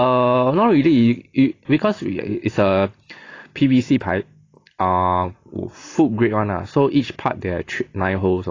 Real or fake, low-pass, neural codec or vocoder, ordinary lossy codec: real; 7.2 kHz; none; AAC, 32 kbps